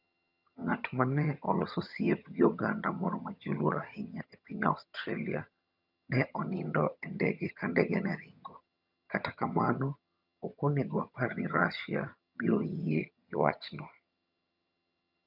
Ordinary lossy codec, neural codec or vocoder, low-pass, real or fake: none; vocoder, 22.05 kHz, 80 mel bands, HiFi-GAN; 5.4 kHz; fake